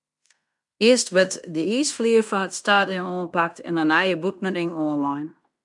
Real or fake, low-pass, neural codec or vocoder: fake; 10.8 kHz; codec, 16 kHz in and 24 kHz out, 0.9 kbps, LongCat-Audio-Codec, fine tuned four codebook decoder